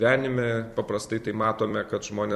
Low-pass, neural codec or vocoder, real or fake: 14.4 kHz; vocoder, 44.1 kHz, 128 mel bands every 256 samples, BigVGAN v2; fake